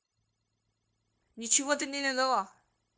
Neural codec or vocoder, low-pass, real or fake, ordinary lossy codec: codec, 16 kHz, 0.9 kbps, LongCat-Audio-Codec; none; fake; none